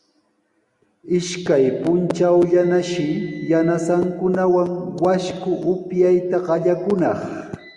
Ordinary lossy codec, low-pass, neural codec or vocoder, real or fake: Opus, 64 kbps; 10.8 kHz; none; real